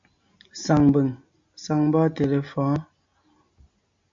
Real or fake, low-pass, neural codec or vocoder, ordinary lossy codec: real; 7.2 kHz; none; MP3, 48 kbps